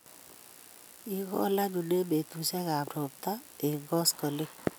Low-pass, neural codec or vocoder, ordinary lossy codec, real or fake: none; none; none; real